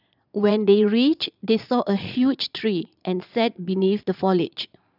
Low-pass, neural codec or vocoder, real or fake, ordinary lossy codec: 5.4 kHz; vocoder, 22.05 kHz, 80 mel bands, Vocos; fake; none